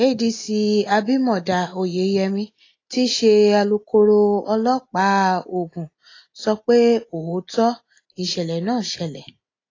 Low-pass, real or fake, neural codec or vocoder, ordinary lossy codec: 7.2 kHz; real; none; AAC, 32 kbps